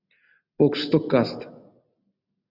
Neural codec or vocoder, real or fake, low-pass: none; real; 5.4 kHz